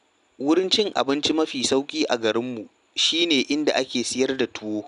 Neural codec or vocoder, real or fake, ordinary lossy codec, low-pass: none; real; none; 10.8 kHz